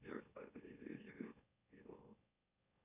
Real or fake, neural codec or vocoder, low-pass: fake; autoencoder, 44.1 kHz, a latent of 192 numbers a frame, MeloTTS; 3.6 kHz